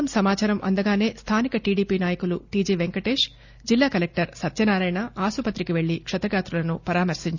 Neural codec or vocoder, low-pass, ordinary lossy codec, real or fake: none; 7.2 kHz; none; real